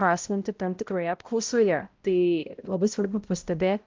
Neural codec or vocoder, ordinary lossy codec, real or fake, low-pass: codec, 16 kHz, 0.5 kbps, X-Codec, HuBERT features, trained on balanced general audio; Opus, 32 kbps; fake; 7.2 kHz